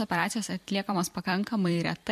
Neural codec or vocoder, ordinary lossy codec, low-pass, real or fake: vocoder, 44.1 kHz, 128 mel bands every 256 samples, BigVGAN v2; MP3, 64 kbps; 14.4 kHz; fake